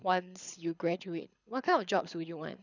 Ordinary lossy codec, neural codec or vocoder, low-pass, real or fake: none; codec, 24 kHz, 6 kbps, HILCodec; 7.2 kHz; fake